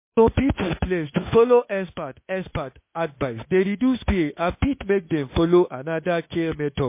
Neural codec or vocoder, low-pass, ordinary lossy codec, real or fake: autoencoder, 48 kHz, 32 numbers a frame, DAC-VAE, trained on Japanese speech; 3.6 kHz; MP3, 24 kbps; fake